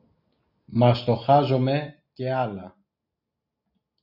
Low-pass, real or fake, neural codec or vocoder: 5.4 kHz; real; none